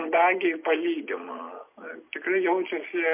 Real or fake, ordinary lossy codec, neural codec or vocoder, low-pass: real; MP3, 24 kbps; none; 3.6 kHz